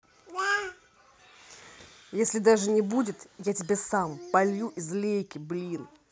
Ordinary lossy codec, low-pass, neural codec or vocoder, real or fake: none; none; none; real